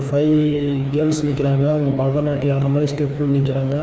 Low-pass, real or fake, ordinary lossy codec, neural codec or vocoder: none; fake; none; codec, 16 kHz, 2 kbps, FreqCodec, larger model